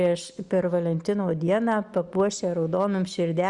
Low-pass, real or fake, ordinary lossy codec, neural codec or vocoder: 10.8 kHz; real; Opus, 64 kbps; none